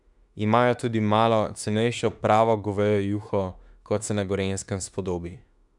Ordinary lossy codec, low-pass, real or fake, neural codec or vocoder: none; 10.8 kHz; fake; autoencoder, 48 kHz, 32 numbers a frame, DAC-VAE, trained on Japanese speech